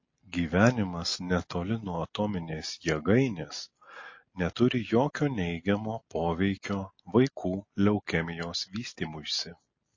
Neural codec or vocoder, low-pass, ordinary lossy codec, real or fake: none; 7.2 kHz; MP3, 32 kbps; real